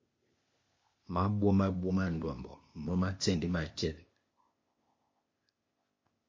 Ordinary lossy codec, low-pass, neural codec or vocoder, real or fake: MP3, 32 kbps; 7.2 kHz; codec, 16 kHz, 0.8 kbps, ZipCodec; fake